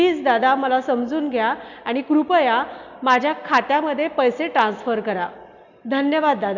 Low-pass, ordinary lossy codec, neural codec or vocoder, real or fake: 7.2 kHz; none; none; real